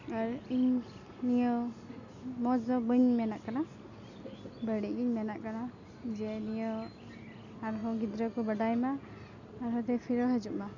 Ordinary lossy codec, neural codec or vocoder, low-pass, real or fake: none; none; 7.2 kHz; real